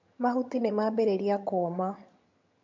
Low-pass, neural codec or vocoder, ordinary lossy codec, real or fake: 7.2 kHz; vocoder, 22.05 kHz, 80 mel bands, HiFi-GAN; MP3, 48 kbps; fake